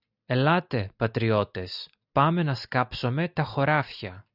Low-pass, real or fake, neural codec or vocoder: 5.4 kHz; real; none